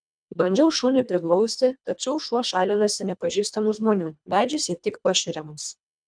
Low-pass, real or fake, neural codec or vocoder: 9.9 kHz; fake; codec, 24 kHz, 1.5 kbps, HILCodec